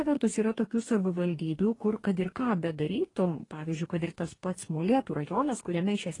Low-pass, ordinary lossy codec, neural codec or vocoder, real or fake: 10.8 kHz; AAC, 32 kbps; codec, 44.1 kHz, 2.6 kbps, DAC; fake